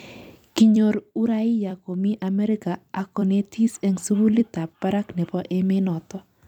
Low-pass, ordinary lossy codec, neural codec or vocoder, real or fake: 19.8 kHz; none; vocoder, 44.1 kHz, 128 mel bands every 256 samples, BigVGAN v2; fake